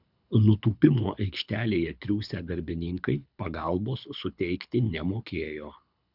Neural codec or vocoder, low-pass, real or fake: codec, 24 kHz, 6 kbps, HILCodec; 5.4 kHz; fake